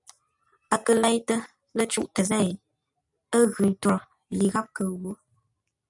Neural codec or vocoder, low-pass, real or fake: none; 10.8 kHz; real